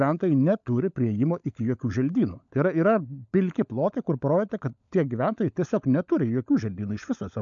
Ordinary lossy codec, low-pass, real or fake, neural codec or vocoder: MP3, 64 kbps; 7.2 kHz; fake; codec, 16 kHz, 16 kbps, FunCodec, trained on LibriTTS, 50 frames a second